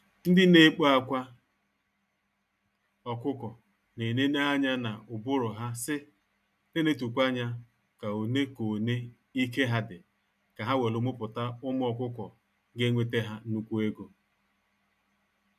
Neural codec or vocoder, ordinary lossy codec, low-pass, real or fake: none; none; 14.4 kHz; real